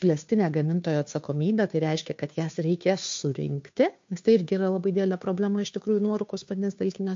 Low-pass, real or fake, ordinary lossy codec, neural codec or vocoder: 7.2 kHz; fake; MP3, 48 kbps; codec, 16 kHz, 2 kbps, FunCodec, trained on Chinese and English, 25 frames a second